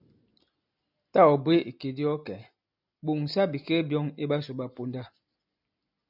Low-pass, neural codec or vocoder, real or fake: 5.4 kHz; none; real